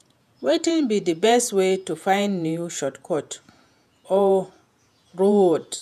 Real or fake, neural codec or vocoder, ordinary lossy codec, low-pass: fake; vocoder, 48 kHz, 128 mel bands, Vocos; none; 14.4 kHz